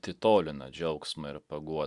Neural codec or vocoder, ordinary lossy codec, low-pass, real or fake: none; Opus, 64 kbps; 10.8 kHz; real